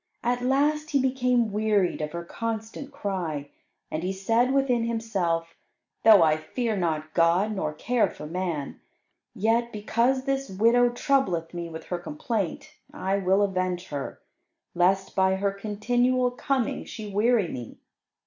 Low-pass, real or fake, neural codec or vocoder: 7.2 kHz; real; none